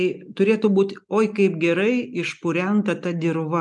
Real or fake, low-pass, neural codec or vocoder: real; 10.8 kHz; none